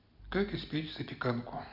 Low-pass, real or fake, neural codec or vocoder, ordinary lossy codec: 5.4 kHz; real; none; none